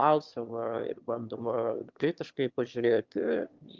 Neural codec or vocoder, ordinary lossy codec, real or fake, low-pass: autoencoder, 22.05 kHz, a latent of 192 numbers a frame, VITS, trained on one speaker; Opus, 32 kbps; fake; 7.2 kHz